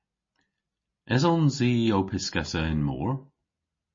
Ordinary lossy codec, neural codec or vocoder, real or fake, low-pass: MP3, 32 kbps; none; real; 7.2 kHz